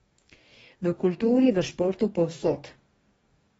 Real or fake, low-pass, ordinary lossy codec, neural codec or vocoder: fake; 19.8 kHz; AAC, 24 kbps; codec, 44.1 kHz, 2.6 kbps, DAC